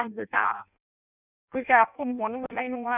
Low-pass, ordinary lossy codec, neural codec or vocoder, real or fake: 3.6 kHz; none; codec, 16 kHz in and 24 kHz out, 0.6 kbps, FireRedTTS-2 codec; fake